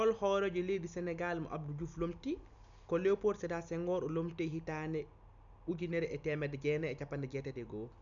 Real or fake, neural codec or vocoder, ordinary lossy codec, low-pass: real; none; none; 7.2 kHz